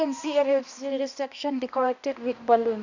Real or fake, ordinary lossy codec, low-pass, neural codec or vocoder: fake; none; 7.2 kHz; codec, 16 kHz, 1 kbps, X-Codec, HuBERT features, trained on balanced general audio